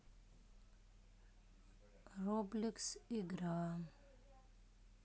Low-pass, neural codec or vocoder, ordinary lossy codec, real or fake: none; none; none; real